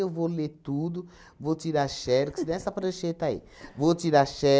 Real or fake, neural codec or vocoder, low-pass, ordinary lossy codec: real; none; none; none